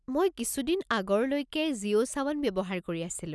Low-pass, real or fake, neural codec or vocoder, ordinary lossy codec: none; real; none; none